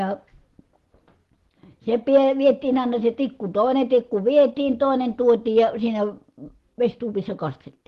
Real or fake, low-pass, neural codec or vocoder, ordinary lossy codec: real; 14.4 kHz; none; Opus, 16 kbps